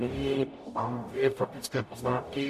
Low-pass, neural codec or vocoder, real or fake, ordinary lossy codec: 14.4 kHz; codec, 44.1 kHz, 0.9 kbps, DAC; fake; MP3, 64 kbps